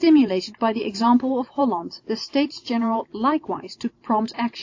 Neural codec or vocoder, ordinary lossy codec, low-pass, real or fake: vocoder, 44.1 kHz, 128 mel bands every 512 samples, BigVGAN v2; AAC, 48 kbps; 7.2 kHz; fake